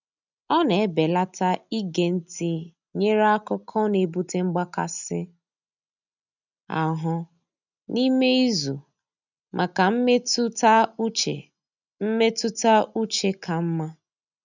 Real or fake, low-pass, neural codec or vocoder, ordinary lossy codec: real; 7.2 kHz; none; none